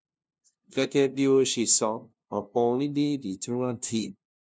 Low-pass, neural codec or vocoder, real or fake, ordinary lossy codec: none; codec, 16 kHz, 0.5 kbps, FunCodec, trained on LibriTTS, 25 frames a second; fake; none